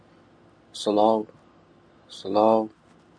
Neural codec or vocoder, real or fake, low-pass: none; real; 9.9 kHz